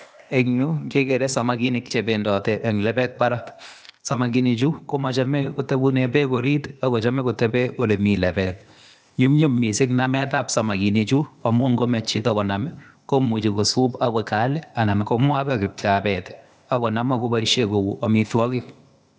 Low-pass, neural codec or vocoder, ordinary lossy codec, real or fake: none; codec, 16 kHz, 0.8 kbps, ZipCodec; none; fake